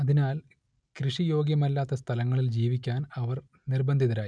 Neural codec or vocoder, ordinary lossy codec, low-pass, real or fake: none; none; 9.9 kHz; real